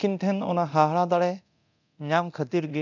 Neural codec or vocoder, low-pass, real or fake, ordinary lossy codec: codec, 24 kHz, 0.9 kbps, DualCodec; 7.2 kHz; fake; none